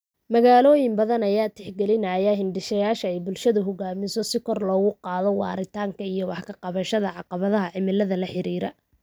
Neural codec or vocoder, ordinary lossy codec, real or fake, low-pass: none; none; real; none